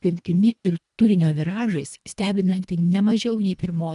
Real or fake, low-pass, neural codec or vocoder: fake; 10.8 kHz; codec, 24 kHz, 1.5 kbps, HILCodec